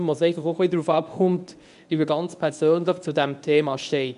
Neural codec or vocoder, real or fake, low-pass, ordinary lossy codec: codec, 24 kHz, 0.9 kbps, WavTokenizer, medium speech release version 1; fake; 10.8 kHz; none